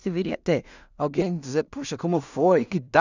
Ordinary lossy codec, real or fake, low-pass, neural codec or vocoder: none; fake; 7.2 kHz; codec, 16 kHz in and 24 kHz out, 0.4 kbps, LongCat-Audio-Codec, two codebook decoder